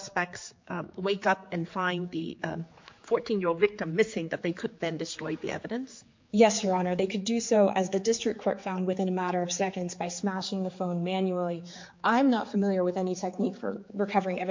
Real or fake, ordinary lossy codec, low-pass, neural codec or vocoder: fake; MP3, 48 kbps; 7.2 kHz; codec, 16 kHz, 4 kbps, X-Codec, HuBERT features, trained on general audio